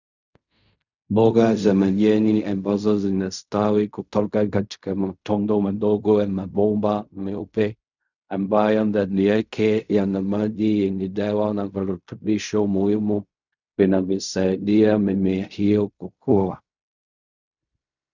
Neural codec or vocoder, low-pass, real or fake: codec, 16 kHz in and 24 kHz out, 0.4 kbps, LongCat-Audio-Codec, fine tuned four codebook decoder; 7.2 kHz; fake